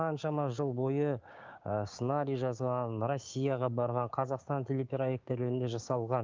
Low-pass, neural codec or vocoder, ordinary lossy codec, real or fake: 7.2 kHz; codec, 16 kHz, 6 kbps, DAC; Opus, 24 kbps; fake